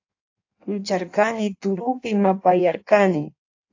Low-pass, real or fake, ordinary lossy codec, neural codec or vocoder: 7.2 kHz; fake; AAC, 48 kbps; codec, 16 kHz in and 24 kHz out, 1.1 kbps, FireRedTTS-2 codec